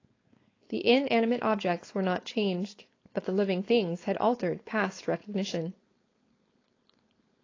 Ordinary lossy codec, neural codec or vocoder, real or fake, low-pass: AAC, 32 kbps; codec, 16 kHz, 4.8 kbps, FACodec; fake; 7.2 kHz